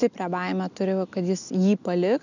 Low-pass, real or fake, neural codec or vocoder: 7.2 kHz; real; none